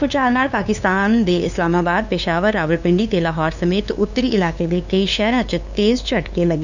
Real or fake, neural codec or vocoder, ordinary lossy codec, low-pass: fake; codec, 16 kHz, 2 kbps, FunCodec, trained on LibriTTS, 25 frames a second; none; 7.2 kHz